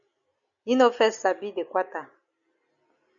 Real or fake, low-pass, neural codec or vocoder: real; 7.2 kHz; none